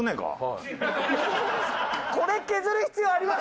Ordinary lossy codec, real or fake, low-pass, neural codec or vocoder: none; real; none; none